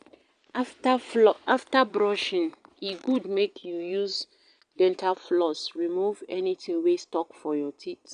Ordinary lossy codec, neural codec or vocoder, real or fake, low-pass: none; vocoder, 22.05 kHz, 80 mel bands, Vocos; fake; 9.9 kHz